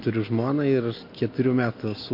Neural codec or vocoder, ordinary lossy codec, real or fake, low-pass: none; MP3, 32 kbps; real; 5.4 kHz